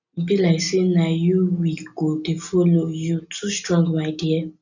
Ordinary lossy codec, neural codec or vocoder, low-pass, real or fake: none; none; 7.2 kHz; real